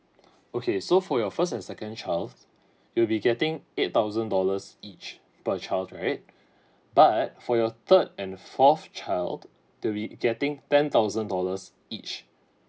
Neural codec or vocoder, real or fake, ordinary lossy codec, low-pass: none; real; none; none